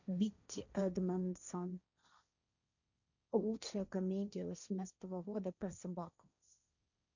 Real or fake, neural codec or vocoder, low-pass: fake; codec, 16 kHz, 1.1 kbps, Voila-Tokenizer; 7.2 kHz